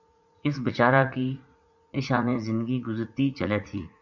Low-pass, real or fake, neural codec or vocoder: 7.2 kHz; fake; vocoder, 44.1 kHz, 80 mel bands, Vocos